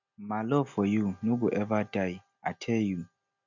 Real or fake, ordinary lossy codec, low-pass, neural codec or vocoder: real; none; 7.2 kHz; none